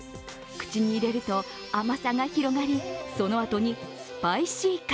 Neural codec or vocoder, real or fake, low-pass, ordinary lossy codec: none; real; none; none